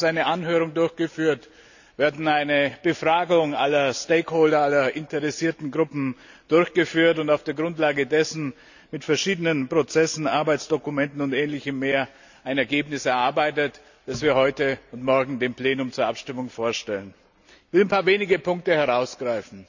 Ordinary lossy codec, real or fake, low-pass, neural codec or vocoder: none; real; 7.2 kHz; none